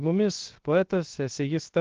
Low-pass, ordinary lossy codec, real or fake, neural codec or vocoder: 7.2 kHz; Opus, 16 kbps; fake; codec, 16 kHz, 0.7 kbps, FocalCodec